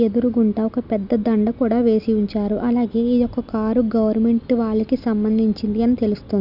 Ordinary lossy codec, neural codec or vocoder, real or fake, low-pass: none; none; real; 5.4 kHz